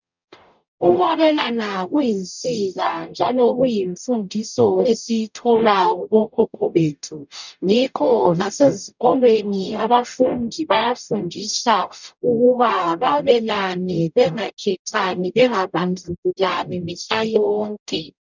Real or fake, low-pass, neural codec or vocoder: fake; 7.2 kHz; codec, 44.1 kHz, 0.9 kbps, DAC